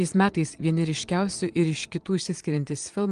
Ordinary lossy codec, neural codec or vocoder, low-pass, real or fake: Opus, 24 kbps; vocoder, 22.05 kHz, 80 mel bands, Vocos; 9.9 kHz; fake